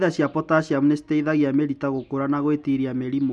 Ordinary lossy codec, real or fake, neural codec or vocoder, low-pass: none; real; none; none